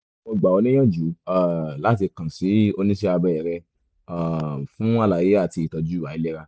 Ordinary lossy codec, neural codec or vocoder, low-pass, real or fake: none; none; none; real